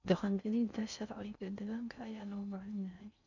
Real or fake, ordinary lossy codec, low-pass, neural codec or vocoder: fake; none; 7.2 kHz; codec, 16 kHz in and 24 kHz out, 0.6 kbps, FocalCodec, streaming, 4096 codes